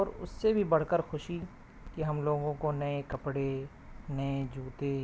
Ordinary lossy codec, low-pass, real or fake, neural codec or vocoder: none; none; real; none